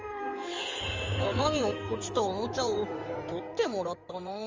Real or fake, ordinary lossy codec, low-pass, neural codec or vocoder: fake; Opus, 32 kbps; 7.2 kHz; codec, 16 kHz in and 24 kHz out, 2.2 kbps, FireRedTTS-2 codec